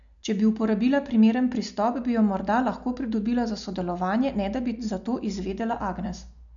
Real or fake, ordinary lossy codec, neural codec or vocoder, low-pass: real; none; none; 7.2 kHz